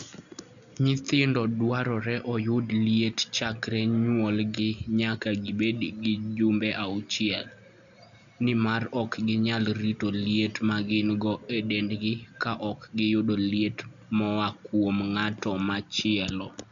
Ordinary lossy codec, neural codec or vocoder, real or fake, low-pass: none; none; real; 7.2 kHz